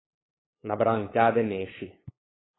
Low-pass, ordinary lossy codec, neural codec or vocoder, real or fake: 7.2 kHz; AAC, 16 kbps; codec, 16 kHz, 8 kbps, FunCodec, trained on LibriTTS, 25 frames a second; fake